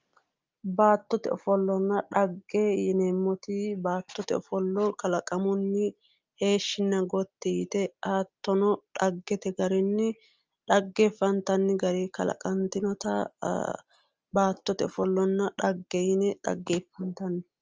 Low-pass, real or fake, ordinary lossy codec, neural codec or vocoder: 7.2 kHz; real; Opus, 24 kbps; none